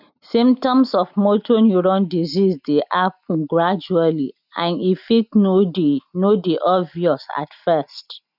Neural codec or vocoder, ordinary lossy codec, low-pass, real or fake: none; none; 5.4 kHz; real